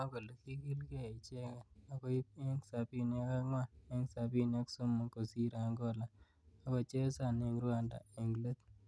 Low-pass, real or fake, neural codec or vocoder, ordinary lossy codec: none; real; none; none